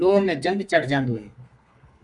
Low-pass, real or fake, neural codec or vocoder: 10.8 kHz; fake; codec, 32 kHz, 1.9 kbps, SNAC